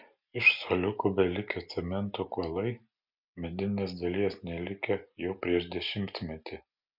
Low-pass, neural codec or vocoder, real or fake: 5.4 kHz; none; real